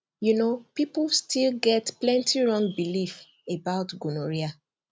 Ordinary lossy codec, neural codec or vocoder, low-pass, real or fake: none; none; none; real